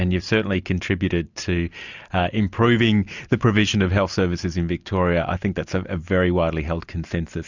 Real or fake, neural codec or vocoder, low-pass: real; none; 7.2 kHz